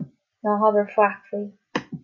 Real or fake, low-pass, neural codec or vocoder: real; 7.2 kHz; none